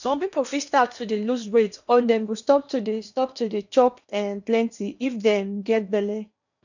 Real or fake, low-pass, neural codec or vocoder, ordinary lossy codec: fake; 7.2 kHz; codec, 16 kHz in and 24 kHz out, 0.8 kbps, FocalCodec, streaming, 65536 codes; none